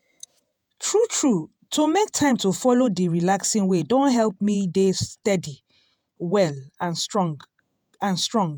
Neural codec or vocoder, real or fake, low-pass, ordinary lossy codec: vocoder, 48 kHz, 128 mel bands, Vocos; fake; none; none